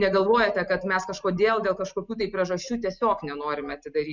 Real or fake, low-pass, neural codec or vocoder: real; 7.2 kHz; none